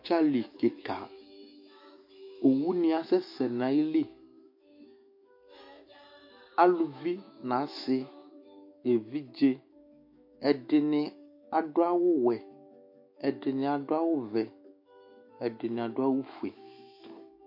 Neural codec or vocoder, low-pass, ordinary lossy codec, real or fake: autoencoder, 48 kHz, 128 numbers a frame, DAC-VAE, trained on Japanese speech; 5.4 kHz; MP3, 32 kbps; fake